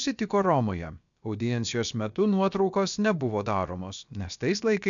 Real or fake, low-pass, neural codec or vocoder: fake; 7.2 kHz; codec, 16 kHz, about 1 kbps, DyCAST, with the encoder's durations